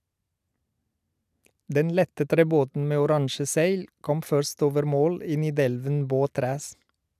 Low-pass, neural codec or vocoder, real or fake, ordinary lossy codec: 14.4 kHz; none; real; none